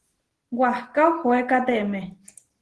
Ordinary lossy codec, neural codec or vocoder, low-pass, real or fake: Opus, 16 kbps; none; 10.8 kHz; real